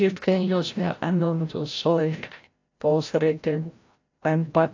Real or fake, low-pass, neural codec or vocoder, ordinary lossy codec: fake; 7.2 kHz; codec, 16 kHz, 0.5 kbps, FreqCodec, larger model; AAC, 48 kbps